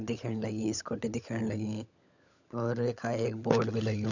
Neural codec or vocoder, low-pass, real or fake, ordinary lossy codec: codec, 16 kHz, 16 kbps, FunCodec, trained on LibriTTS, 50 frames a second; 7.2 kHz; fake; none